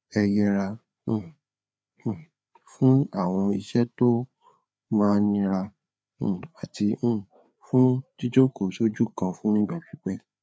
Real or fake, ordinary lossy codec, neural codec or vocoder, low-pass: fake; none; codec, 16 kHz, 4 kbps, FreqCodec, larger model; none